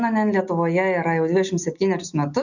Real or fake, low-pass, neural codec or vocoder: real; 7.2 kHz; none